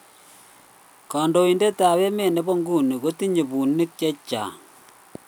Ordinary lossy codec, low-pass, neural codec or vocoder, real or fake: none; none; none; real